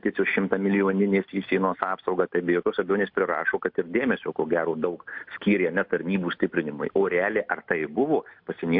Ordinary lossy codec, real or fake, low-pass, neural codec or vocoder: MP3, 48 kbps; real; 5.4 kHz; none